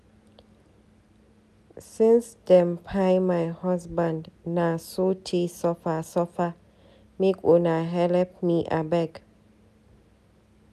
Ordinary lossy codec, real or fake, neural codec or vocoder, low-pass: none; real; none; 14.4 kHz